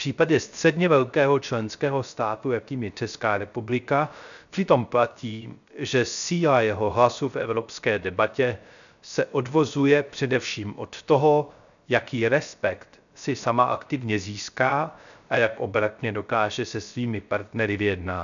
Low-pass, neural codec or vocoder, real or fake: 7.2 kHz; codec, 16 kHz, 0.3 kbps, FocalCodec; fake